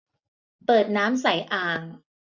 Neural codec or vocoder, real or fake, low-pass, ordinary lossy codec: none; real; 7.2 kHz; none